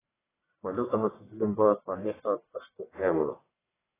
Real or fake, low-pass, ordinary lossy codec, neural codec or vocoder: fake; 3.6 kHz; AAC, 16 kbps; codec, 44.1 kHz, 1.7 kbps, Pupu-Codec